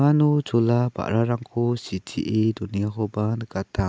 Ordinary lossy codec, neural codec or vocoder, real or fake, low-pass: none; none; real; none